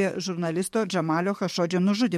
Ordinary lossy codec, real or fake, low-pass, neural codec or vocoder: MP3, 64 kbps; fake; 19.8 kHz; codec, 44.1 kHz, 7.8 kbps, DAC